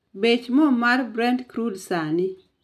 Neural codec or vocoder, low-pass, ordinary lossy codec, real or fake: none; 14.4 kHz; none; real